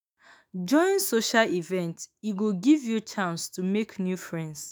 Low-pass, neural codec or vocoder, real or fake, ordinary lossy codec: none; autoencoder, 48 kHz, 128 numbers a frame, DAC-VAE, trained on Japanese speech; fake; none